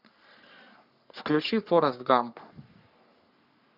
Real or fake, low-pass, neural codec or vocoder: fake; 5.4 kHz; codec, 44.1 kHz, 3.4 kbps, Pupu-Codec